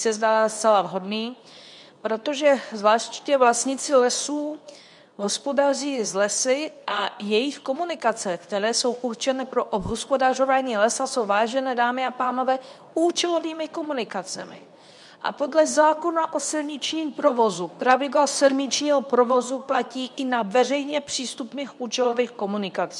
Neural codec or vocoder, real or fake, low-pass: codec, 24 kHz, 0.9 kbps, WavTokenizer, medium speech release version 2; fake; 10.8 kHz